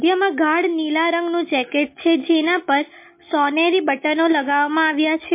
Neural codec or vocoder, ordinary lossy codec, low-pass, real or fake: none; MP3, 24 kbps; 3.6 kHz; real